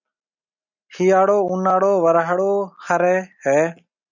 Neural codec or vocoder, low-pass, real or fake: none; 7.2 kHz; real